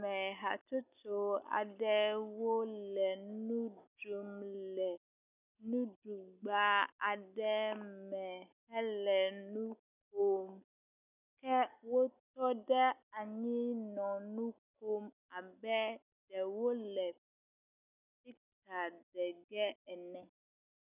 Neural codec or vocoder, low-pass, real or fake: none; 3.6 kHz; real